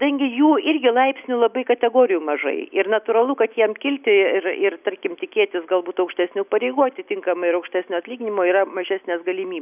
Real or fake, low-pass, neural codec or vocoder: real; 3.6 kHz; none